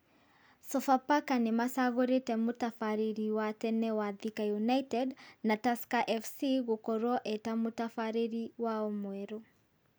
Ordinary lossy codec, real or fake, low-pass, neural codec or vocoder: none; real; none; none